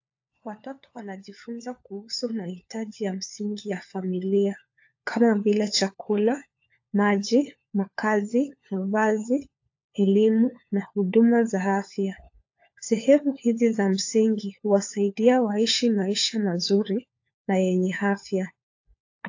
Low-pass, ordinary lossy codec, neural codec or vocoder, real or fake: 7.2 kHz; AAC, 48 kbps; codec, 16 kHz, 4 kbps, FunCodec, trained on LibriTTS, 50 frames a second; fake